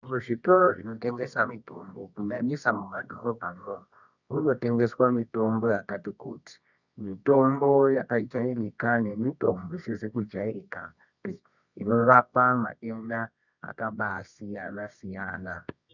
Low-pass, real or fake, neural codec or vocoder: 7.2 kHz; fake; codec, 24 kHz, 0.9 kbps, WavTokenizer, medium music audio release